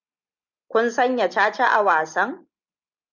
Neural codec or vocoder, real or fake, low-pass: none; real; 7.2 kHz